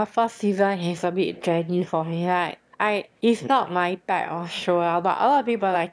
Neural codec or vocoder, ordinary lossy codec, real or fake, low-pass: autoencoder, 22.05 kHz, a latent of 192 numbers a frame, VITS, trained on one speaker; none; fake; none